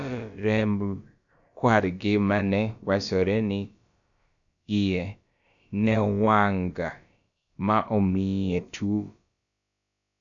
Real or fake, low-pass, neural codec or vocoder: fake; 7.2 kHz; codec, 16 kHz, about 1 kbps, DyCAST, with the encoder's durations